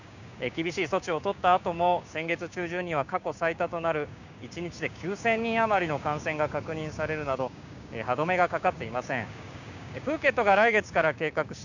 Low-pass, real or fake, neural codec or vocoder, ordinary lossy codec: 7.2 kHz; fake; codec, 16 kHz, 6 kbps, DAC; none